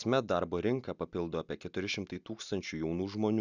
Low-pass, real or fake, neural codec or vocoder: 7.2 kHz; real; none